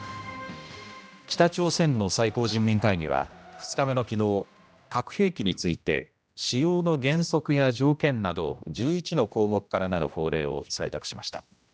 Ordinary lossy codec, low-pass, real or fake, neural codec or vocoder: none; none; fake; codec, 16 kHz, 1 kbps, X-Codec, HuBERT features, trained on general audio